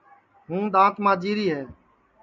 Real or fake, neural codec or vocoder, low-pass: real; none; 7.2 kHz